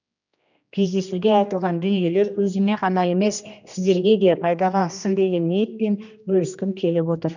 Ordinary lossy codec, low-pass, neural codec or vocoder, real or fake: none; 7.2 kHz; codec, 16 kHz, 1 kbps, X-Codec, HuBERT features, trained on general audio; fake